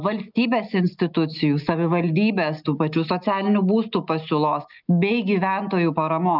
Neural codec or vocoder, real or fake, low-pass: none; real; 5.4 kHz